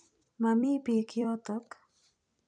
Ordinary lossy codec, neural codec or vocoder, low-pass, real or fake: none; vocoder, 44.1 kHz, 128 mel bands every 256 samples, BigVGAN v2; 9.9 kHz; fake